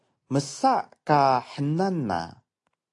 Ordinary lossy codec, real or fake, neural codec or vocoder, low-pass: AAC, 48 kbps; real; none; 10.8 kHz